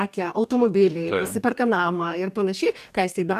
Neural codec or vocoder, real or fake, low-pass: codec, 44.1 kHz, 2.6 kbps, DAC; fake; 14.4 kHz